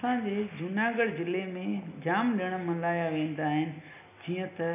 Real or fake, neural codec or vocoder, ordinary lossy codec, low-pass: real; none; none; 3.6 kHz